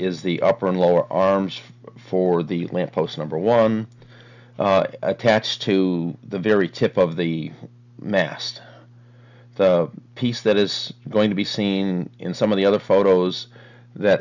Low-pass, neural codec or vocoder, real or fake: 7.2 kHz; none; real